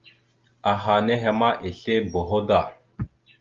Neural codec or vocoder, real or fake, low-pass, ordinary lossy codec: none; real; 7.2 kHz; Opus, 32 kbps